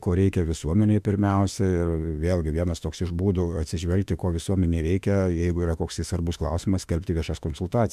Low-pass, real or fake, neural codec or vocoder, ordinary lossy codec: 14.4 kHz; fake; autoencoder, 48 kHz, 32 numbers a frame, DAC-VAE, trained on Japanese speech; MP3, 96 kbps